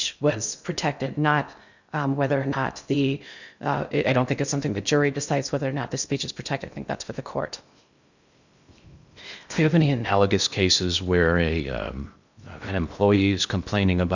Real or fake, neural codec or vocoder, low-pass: fake; codec, 16 kHz in and 24 kHz out, 0.6 kbps, FocalCodec, streaming, 2048 codes; 7.2 kHz